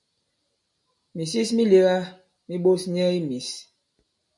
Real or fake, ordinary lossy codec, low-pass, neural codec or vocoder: real; MP3, 48 kbps; 10.8 kHz; none